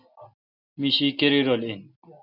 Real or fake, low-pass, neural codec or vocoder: real; 5.4 kHz; none